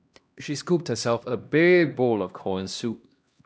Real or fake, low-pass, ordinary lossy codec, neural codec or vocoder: fake; none; none; codec, 16 kHz, 1 kbps, X-Codec, HuBERT features, trained on LibriSpeech